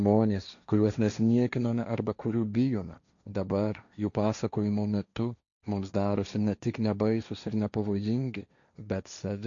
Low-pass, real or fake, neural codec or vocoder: 7.2 kHz; fake; codec, 16 kHz, 1.1 kbps, Voila-Tokenizer